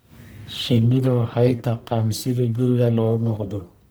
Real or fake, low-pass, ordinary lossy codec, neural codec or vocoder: fake; none; none; codec, 44.1 kHz, 1.7 kbps, Pupu-Codec